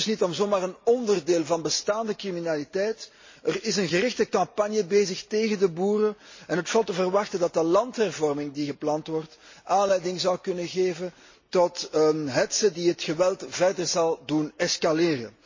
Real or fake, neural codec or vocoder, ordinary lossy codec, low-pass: real; none; MP3, 32 kbps; 7.2 kHz